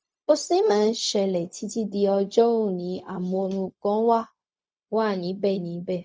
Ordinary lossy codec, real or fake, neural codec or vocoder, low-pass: none; fake; codec, 16 kHz, 0.4 kbps, LongCat-Audio-Codec; none